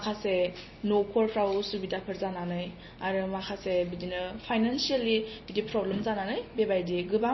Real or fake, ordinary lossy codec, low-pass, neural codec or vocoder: real; MP3, 24 kbps; 7.2 kHz; none